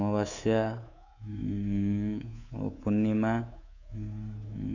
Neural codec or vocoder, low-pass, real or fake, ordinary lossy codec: codec, 24 kHz, 3.1 kbps, DualCodec; 7.2 kHz; fake; none